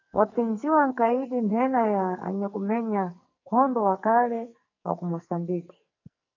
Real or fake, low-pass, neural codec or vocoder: fake; 7.2 kHz; codec, 44.1 kHz, 2.6 kbps, SNAC